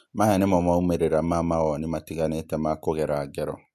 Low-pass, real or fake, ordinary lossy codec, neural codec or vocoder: 14.4 kHz; real; MP3, 64 kbps; none